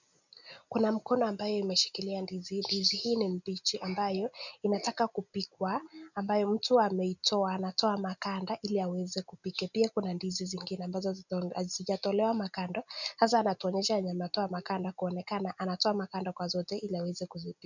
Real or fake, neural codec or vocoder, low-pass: real; none; 7.2 kHz